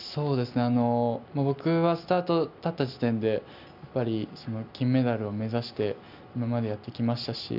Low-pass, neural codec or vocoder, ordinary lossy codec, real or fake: 5.4 kHz; none; none; real